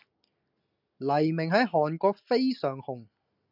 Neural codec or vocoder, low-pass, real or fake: none; 5.4 kHz; real